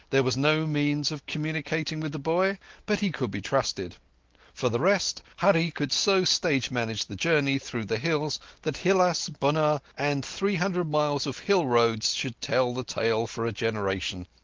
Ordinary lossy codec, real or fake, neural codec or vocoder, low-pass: Opus, 16 kbps; real; none; 7.2 kHz